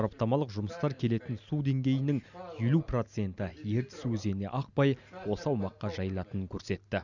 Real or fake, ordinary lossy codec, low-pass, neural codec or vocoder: real; none; 7.2 kHz; none